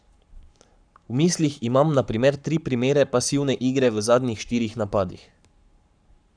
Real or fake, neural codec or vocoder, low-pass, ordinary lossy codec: fake; codec, 44.1 kHz, 7.8 kbps, Pupu-Codec; 9.9 kHz; none